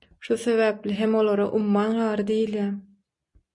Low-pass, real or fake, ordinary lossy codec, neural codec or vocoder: 10.8 kHz; real; MP3, 96 kbps; none